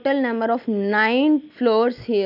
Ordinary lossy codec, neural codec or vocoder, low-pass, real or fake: none; none; 5.4 kHz; real